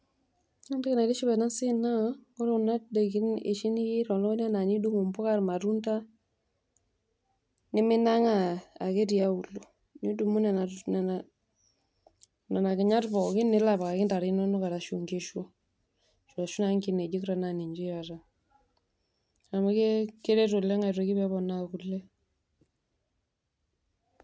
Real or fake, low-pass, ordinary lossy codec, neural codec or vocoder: real; none; none; none